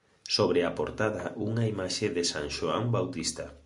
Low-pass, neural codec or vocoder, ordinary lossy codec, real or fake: 10.8 kHz; none; Opus, 64 kbps; real